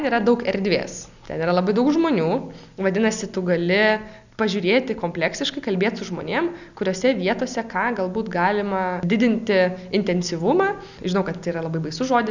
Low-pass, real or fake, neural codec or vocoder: 7.2 kHz; real; none